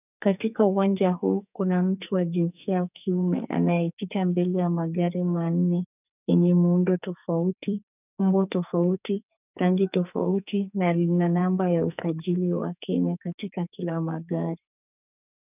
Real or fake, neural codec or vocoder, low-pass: fake; codec, 44.1 kHz, 2.6 kbps, SNAC; 3.6 kHz